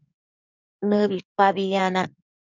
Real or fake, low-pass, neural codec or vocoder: fake; 7.2 kHz; codec, 16 kHz in and 24 kHz out, 2.2 kbps, FireRedTTS-2 codec